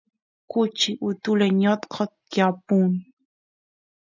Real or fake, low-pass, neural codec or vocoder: real; 7.2 kHz; none